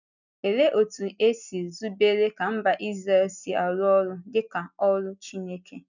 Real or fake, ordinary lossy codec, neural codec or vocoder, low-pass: real; none; none; 7.2 kHz